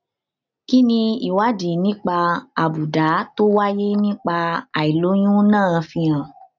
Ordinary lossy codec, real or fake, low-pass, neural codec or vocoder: none; real; 7.2 kHz; none